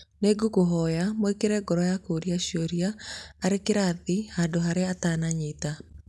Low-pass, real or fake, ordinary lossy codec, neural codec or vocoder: none; real; none; none